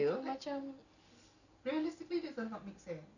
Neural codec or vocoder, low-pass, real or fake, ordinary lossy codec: none; 7.2 kHz; real; none